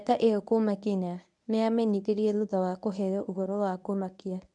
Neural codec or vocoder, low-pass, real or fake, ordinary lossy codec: codec, 24 kHz, 0.9 kbps, WavTokenizer, medium speech release version 1; 10.8 kHz; fake; none